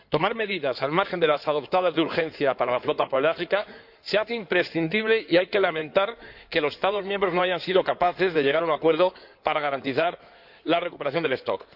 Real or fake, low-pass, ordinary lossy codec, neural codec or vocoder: fake; 5.4 kHz; none; codec, 16 kHz in and 24 kHz out, 2.2 kbps, FireRedTTS-2 codec